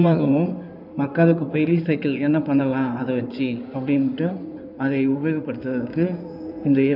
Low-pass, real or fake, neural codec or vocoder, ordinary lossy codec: 5.4 kHz; fake; codec, 16 kHz in and 24 kHz out, 2.2 kbps, FireRedTTS-2 codec; none